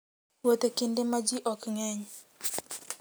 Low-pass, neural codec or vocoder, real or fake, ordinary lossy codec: none; none; real; none